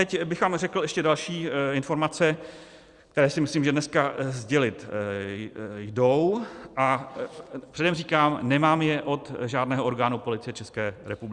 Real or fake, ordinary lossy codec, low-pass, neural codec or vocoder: fake; Opus, 64 kbps; 10.8 kHz; vocoder, 44.1 kHz, 128 mel bands every 256 samples, BigVGAN v2